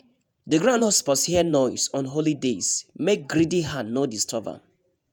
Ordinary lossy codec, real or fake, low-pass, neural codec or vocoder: none; fake; none; vocoder, 48 kHz, 128 mel bands, Vocos